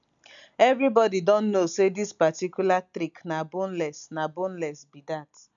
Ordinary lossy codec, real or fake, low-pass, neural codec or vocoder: none; real; 7.2 kHz; none